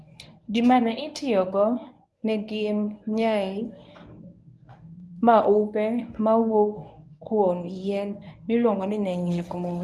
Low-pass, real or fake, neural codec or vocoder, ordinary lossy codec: none; fake; codec, 24 kHz, 0.9 kbps, WavTokenizer, medium speech release version 1; none